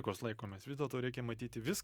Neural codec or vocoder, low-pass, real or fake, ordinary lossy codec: none; 19.8 kHz; real; Opus, 64 kbps